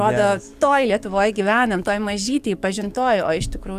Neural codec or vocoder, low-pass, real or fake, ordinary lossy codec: codec, 44.1 kHz, 7.8 kbps, DAC; 14.4 kHz; fake; Opus, 64 kbps